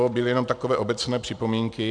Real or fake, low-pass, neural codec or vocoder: real; 9.9 kHz; none